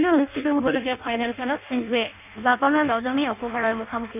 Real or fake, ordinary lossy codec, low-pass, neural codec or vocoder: fake; none; 3.6 kHz; codec, 16 kHz in and 24 kHz out, 0.6 kbps, FireRedTTS-2 codec